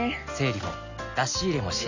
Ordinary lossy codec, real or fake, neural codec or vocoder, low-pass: Opus, 64 kbps; real; none; 7.2 kHz